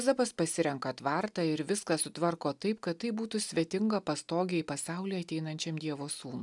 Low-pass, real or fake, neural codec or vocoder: 10.8 kHz; real; none